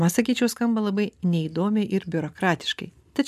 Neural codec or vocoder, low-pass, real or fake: none; 14.4 kHz; real